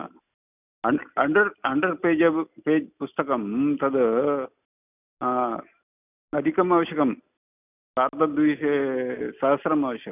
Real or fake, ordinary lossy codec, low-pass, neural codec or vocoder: real; none; 3.6 kHz; none